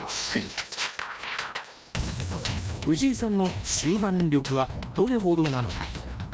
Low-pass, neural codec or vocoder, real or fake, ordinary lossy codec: none; codec, 16 kHz, 1 kbps, FreqCodec, larger model; fake; none